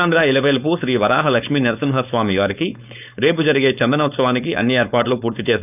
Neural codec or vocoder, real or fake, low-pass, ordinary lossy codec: codec, 16 kHz, 4.8 kbps, FACodec; fake; 3.6 kHz; none